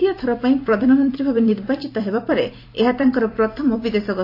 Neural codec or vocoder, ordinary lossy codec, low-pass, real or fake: vocoder, 44.1 kHz, 128 mel bands every 256 samples, BigVGAN v2; AAC, 32 kbps; 5.4 kHz; fake